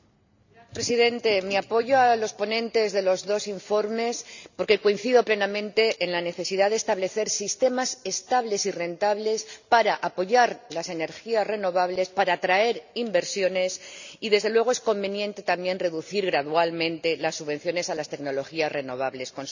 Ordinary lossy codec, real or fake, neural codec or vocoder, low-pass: none; real; none; 7.2 kHz